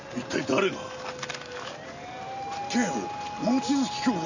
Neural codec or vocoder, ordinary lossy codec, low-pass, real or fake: none; none; 7.2 kHz; real